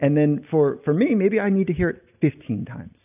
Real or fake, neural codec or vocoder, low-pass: real; none; 3.6 kHz